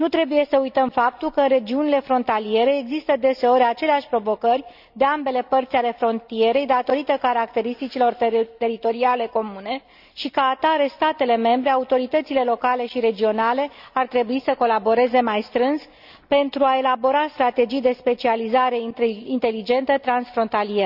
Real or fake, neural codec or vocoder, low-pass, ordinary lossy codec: real; none; 5.4 kHz; none